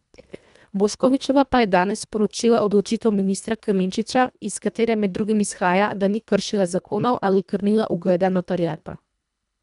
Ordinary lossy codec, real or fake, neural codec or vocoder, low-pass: none; fake; codec, 24 kHz, 1.5 kbps, HILCodec; 10.8 kHz